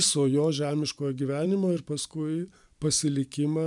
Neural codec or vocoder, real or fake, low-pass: vocoder, 44.1 kHz, 128 mel bands every 256 samples, BigVGAN v2; fake; 10.8 kHz